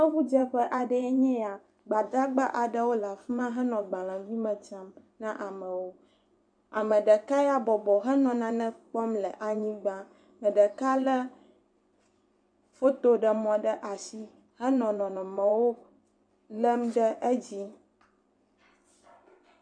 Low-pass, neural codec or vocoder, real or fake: 9.9 kHz; vocoder, 24 kHz, 100 mel bands, Vocos; fake